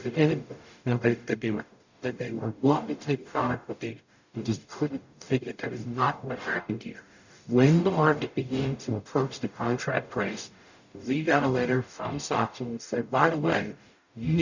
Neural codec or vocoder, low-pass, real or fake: codec, 44.1 kHz, 0.9 kbps, DAC; 7.2 kHz; fake